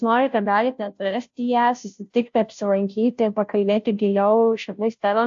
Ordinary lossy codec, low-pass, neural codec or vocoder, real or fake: AAC, 64 kbps; 7.2 kHz; codec, 16 kHz, 0.5 kbps, FunCodec, trained on Chinese and English, 25 frames a second; fake